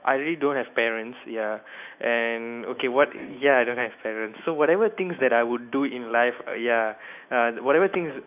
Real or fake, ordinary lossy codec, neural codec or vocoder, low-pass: fake; none; autoencoder, 48 kHz, 128 numbers a frame, DAC-VAE, trained on Japanese speech; 3.6 kHz